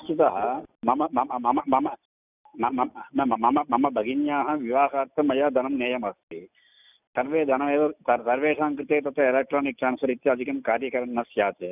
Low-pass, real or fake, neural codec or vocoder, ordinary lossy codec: 3.6 kHz; real; none; none